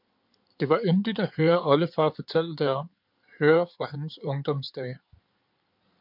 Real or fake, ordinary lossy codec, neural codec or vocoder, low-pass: fake; MP3, 48 kbps; codec, 16 kHz in and 24 kHz out, 2.2 kbps, FireRedTTS-2 codec; 5.4 kHz